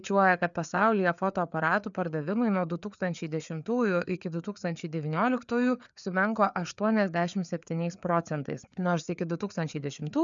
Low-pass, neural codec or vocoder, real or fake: 7.2 kHz; codec, 16 kHz, 4 kbps, FreqCodec, larger model; fake